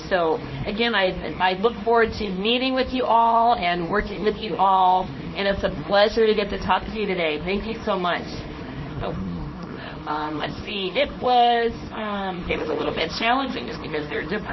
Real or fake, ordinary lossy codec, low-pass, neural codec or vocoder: fake; MP3, 24 kbps; 7.2 kHz; codec, 24 kHz, 0.9 kbps, WavTokenizer, small release